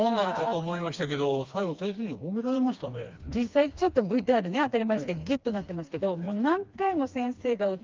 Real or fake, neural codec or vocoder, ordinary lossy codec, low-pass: fake; codec, 16 kHz, 2 kbps, FreqCodec, smaller model; Opus, 32 kbps; 7.2 kHz